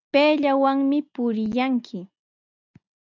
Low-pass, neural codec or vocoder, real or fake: 7.2 kHz; none; real